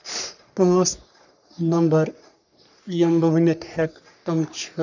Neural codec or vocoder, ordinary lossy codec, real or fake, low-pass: codec, 44.1 kHz, 3.4 kbps, Pupu-Codec; none; fake; 7.2 kHz